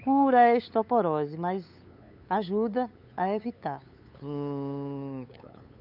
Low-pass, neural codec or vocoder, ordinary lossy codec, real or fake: 5.4 kHz; codec, 16 kHz, 8 kbps, FunCodec, trained on LibriTTS, 25 frames a second; none; fake